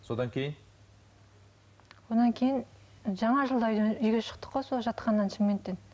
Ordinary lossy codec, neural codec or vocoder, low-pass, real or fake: none; none; none; real